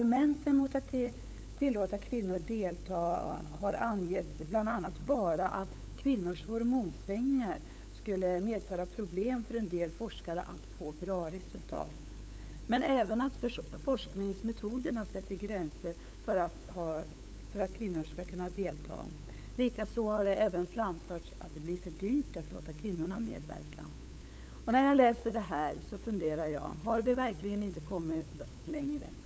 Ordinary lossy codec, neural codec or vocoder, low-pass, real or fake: none; codec, 16 kHz, 8 kbps, FunCodec, trained on LibriTTS, 25 frames a second; none; fake